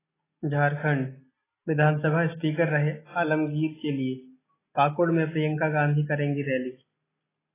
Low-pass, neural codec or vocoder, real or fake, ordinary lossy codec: 3.6 kHz; none; real; AAC, 16 kbps